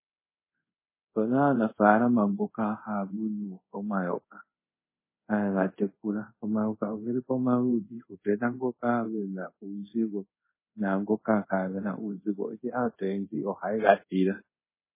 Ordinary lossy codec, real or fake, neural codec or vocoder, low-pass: MP3, 16 kbps; fake; codec, 24 kHz, 0.5 kbps, DualCodec; 3.6 kHz